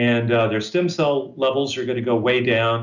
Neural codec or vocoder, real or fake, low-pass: none; real; 7.2 kHz